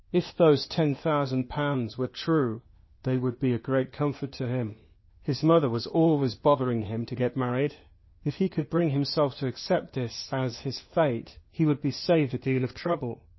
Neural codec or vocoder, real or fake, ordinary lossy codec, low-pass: codec, 16 kHz, 0.8 kbps, ZipCodec; fake; MP3, 24 kbps; 7.2 kHz